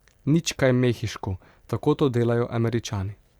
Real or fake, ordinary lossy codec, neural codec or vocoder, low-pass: fake; Opus, 64 kbps; vocoder, 44.1 kHz, 128 mel bands every 512 samples, BigVGAN v2; 19.8 kHz